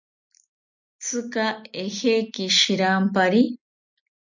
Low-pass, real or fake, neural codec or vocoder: 7.2 kHz; real; none